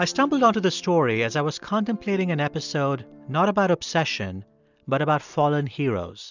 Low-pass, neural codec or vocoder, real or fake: 7.2 kHz; none; real